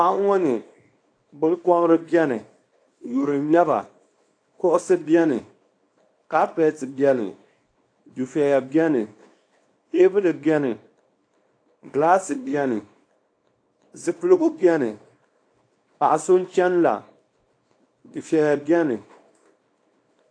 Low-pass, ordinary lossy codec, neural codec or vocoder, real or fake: 9.9 kHz; AAC, 48 kbps; codec, 24 kHz, 0.9 kbps, WavTokenizer, small release; fake